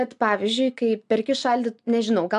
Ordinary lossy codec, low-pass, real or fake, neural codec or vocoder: MP3, 96 kbps; 10.8 kHz; real; none